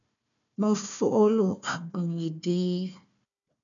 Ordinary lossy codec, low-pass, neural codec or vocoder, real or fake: MP3, 96 kbps; 7.2 kHz; codec, 16 kHz, 1 kbps, FunCodec, trained on Chinese and English, 50 frames a second; fake